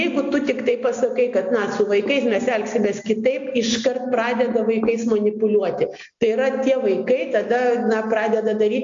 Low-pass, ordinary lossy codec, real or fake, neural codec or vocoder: 7.2 kHz; AAC, 64 kbps; real; none